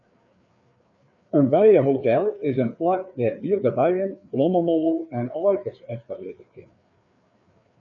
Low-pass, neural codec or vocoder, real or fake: 7.2 kHz; codec, 16 kHz, 4 kbps, FreqCodec, larger model; fake